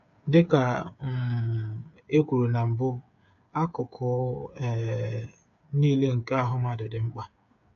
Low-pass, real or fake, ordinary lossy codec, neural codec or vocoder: 7.2 kHz; fake; AAC, 64 kbps; codec, 16 kHz, 8 kbps, FreqCodec, smaller model